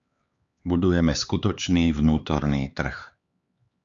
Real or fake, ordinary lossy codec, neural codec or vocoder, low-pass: fake; Opus, 64 kbps; codec, 16 kHz, 4 kbps, X-Codec, HuBERT features, trained on LibriSpeech; 7.2 kHz